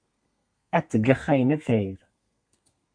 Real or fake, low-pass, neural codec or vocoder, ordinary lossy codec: fake; 9.9 kHz; codec, 32 kHz, 1.9 kbps, SNAC; MP3, 64 kbps